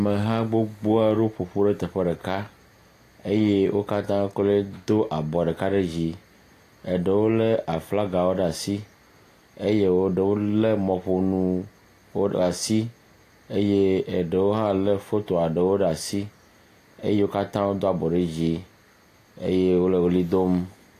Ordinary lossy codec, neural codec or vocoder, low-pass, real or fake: AAC, 48 kbps; none; 14.4 kHz; real